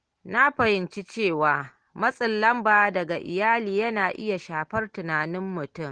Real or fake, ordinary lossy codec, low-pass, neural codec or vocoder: real; Opus, 16 kbps; 9.9 kHz; none